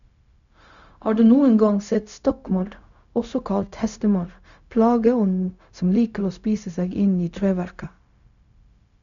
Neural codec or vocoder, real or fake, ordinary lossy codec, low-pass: codec, 16 kHz, 0.4 kbps, LongCat-Audio-Codec; fake; none; 7.2 kHz